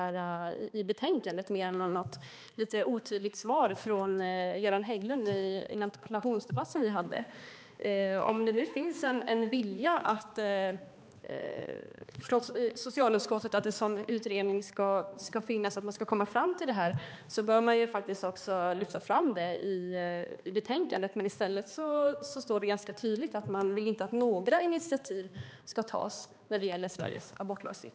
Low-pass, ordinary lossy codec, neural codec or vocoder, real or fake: none; none; codec, 16 kHz, 2 kbps, X-Codec, HuBERT features, trained on balanced general audio; fake